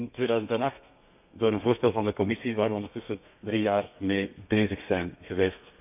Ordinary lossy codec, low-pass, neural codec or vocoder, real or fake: none; 3.6 kHz; codec, 32 kHz, 1.9 kbps, SNAC; fake